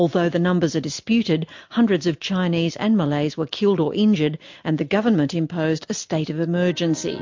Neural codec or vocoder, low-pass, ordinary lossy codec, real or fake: none; 7.2 kHz; MP3, 48 kbps; real